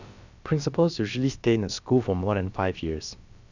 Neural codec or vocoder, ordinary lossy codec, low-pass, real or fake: codec, 16 kHz, about 1 kbps, DyCAST, with the encoder's durations; none; 7.2 kHz; fake